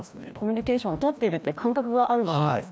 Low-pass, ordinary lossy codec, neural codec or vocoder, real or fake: none; none; codec, 16 kHz, 1 kbps, FreqCodec, larger model; fake